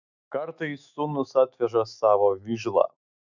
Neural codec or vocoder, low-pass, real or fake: autoencoder, 48 kHz, 128 numbers a frame, DAC-VAE, trained on Japanese speech; 7.2 kHz; fake